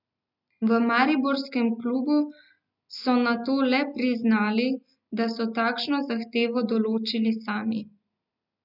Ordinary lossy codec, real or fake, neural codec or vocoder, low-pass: none; real; none; 5.4 kHz